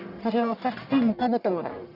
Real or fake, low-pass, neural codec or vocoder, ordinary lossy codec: fake; 5.4 kHz; codec, 44.1 kHz, 1.7 kbps, Pupu-Codec; none